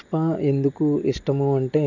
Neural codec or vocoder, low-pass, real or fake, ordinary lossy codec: none; 7.2 kHz; real; none